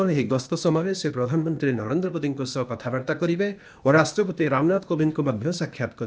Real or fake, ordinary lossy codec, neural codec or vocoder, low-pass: fake; none; codec, 16 kHz, 0.8 kbps, ZipCodec; none